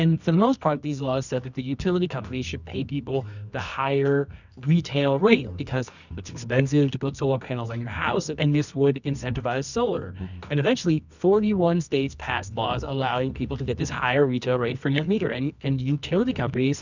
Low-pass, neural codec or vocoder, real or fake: 7.2 kHz; codec, 24 kHz, 0.9 kbps, WavTokenizer, medium music audio release; fake